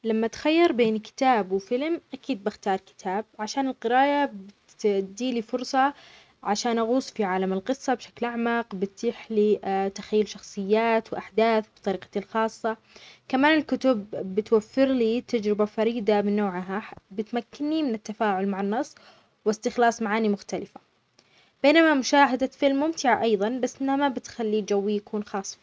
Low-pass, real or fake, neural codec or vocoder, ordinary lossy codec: none; real; none; none